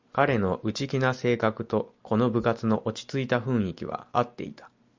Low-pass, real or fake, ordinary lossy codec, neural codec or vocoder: 7.2 kHz; real; MP3, 64 kbps; none